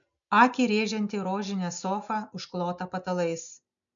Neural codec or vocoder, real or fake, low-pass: none; real; 7.2 kHz